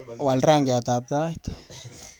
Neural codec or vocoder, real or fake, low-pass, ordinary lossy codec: codec, 44.1 kHz, 7.8 kbps, DAC; fake; none; none